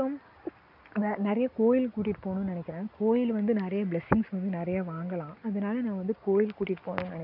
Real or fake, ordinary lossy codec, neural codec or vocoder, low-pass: real; none; none; 5.4 kHz